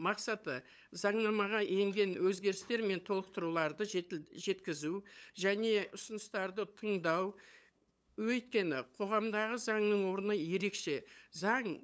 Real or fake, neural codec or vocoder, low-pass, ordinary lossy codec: fake; codec, 16 kHz, 8 kbps, FunCodec, trained on LibriTTS, 25 frames a second; none; none